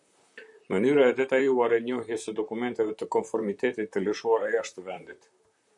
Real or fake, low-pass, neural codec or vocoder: fake; 10.8 kHz; vocoder, 44.1 kHz, 128 mel bands, Pupu-Vocoder